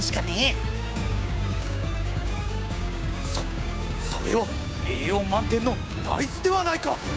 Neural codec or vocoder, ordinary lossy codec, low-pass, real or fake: codec, 16 kHz, 6 kbps, DAC; none; none; fake